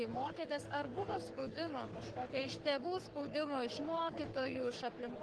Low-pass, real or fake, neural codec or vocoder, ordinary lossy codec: 10.8 kHz; fake; codec, 44.1 kHz, 3.4 kbps, Pupu-Codec; Opus, 16 kbps